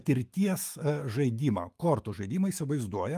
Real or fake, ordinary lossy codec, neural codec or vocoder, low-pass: fake; Opus, 32 kbps; autoencoder, 48 kHz, 128 numbers a frame, DAC-VAE, trained on Japanese speech; 14.4 kHz